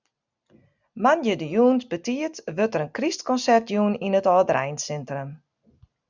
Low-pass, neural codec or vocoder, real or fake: 7.2 kHz; none; real